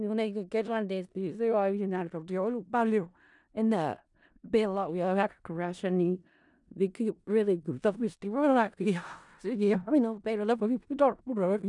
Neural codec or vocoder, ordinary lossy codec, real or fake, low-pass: codec, 16 kHz in and 24 kHz out, 0.4 kbps, LongCat-Audio-Codec, four codebook decoder; none; fake; 10.8 kHz